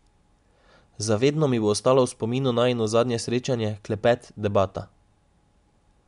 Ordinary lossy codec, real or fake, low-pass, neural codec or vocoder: MP3, 64 kbps; real; 10.8 kHz; none